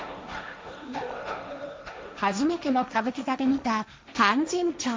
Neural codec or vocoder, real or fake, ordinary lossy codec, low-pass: codec, 16 kHz, 1.1 kbps, Voila-Tokenizer; fake; none; none